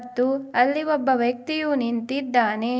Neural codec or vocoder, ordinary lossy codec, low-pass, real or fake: none; none; none; real